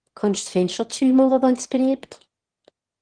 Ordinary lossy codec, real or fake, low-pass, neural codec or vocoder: Opus, 16 kbps; fake; 9.9 kHz; autoencoder, 22.05 kHz, a latent of 192 numbers a frame, VITS, trained on one speaker